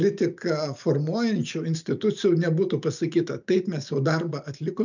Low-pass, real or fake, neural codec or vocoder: 7.2 kHz; real; none